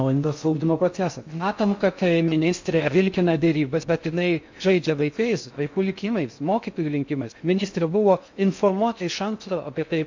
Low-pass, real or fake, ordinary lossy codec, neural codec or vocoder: 7.2 kHz; fake; MP3, 48 kbps; codec, 16 kHz in and 24 kHz out, 0.6 kbps, FocalCodec, streaming, 4096 codes